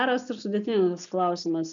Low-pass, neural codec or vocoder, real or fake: 7.2 kHz; none; real